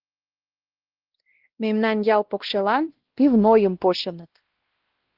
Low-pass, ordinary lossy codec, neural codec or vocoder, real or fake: 5.4 kHz; Opus, 16 kbps; codec, 16 kHz, 1 kbps, X-Codec, WavLM features, trained on Multilingual LibriSpeech; fake